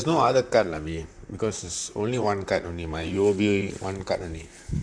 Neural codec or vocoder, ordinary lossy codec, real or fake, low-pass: vocoder, 44.1 kHz, 128 mel bands, Pupu-Vocoder; none; fake; 9.9 kHz